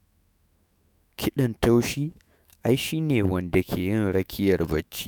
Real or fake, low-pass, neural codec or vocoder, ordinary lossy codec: fake; none; autoencoder, 48 kHz, 128 numbers a frame, DAC-VAE, trained on Japanese speech; none